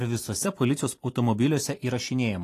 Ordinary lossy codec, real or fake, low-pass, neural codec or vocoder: AAC, 48 kbps; fake; 14.4 kHz; vocoder, 44.1 kHz, 128 mel bands every 256 samples, BigVGAN v2